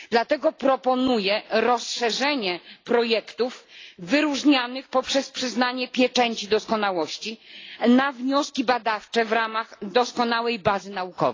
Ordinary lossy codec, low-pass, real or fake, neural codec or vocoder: AAC, 32 kbps; 7.2 kHz; real; none